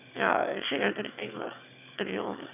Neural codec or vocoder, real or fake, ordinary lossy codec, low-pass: autoencoder, 22.05 kHz, a latent of 192 numbers a frame, VITS, trained on one speaker; fake; none; 3.6 kHz